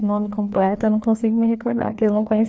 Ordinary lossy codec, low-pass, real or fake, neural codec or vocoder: none; none; fake; codec, 16 kHz, 2 kbps, FreqCodec, larger model